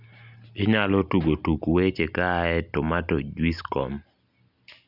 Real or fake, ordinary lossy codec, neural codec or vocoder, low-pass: real; none; none; 5.4 kHz